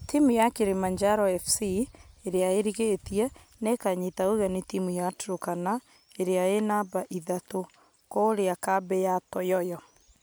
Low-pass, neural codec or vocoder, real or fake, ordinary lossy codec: none; none; real; none